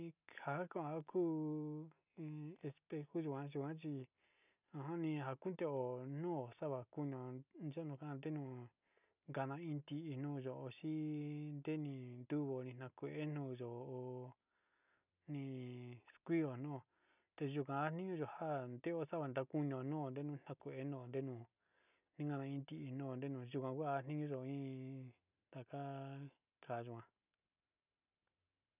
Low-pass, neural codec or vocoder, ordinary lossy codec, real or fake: 3.6 kHz; none; none; real